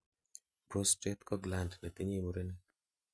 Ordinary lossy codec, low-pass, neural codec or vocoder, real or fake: none; none; none; real